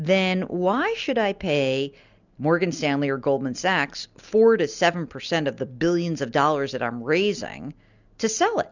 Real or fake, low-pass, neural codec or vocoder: real; 7.2 kHz; none